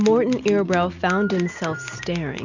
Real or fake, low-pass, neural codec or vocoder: real; 7.2 kHz; none